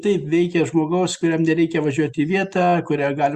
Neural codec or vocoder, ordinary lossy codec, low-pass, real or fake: none; Opus, 64 kbps; 14.4 kHz; real